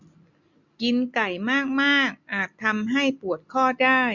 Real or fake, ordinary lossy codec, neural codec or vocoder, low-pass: real; none; none; 7.2 kHz